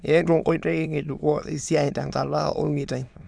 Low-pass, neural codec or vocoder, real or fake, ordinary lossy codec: 9.9 kHz; autoencoder, 22.05 kHz, a latent of 192 numbers a frame, VITS, trained on many speakers; fake; none